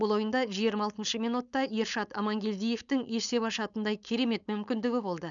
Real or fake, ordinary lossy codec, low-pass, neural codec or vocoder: fake; none; 7.2 kHz; codec, 16 kHz, 4.8 kbps, FACodec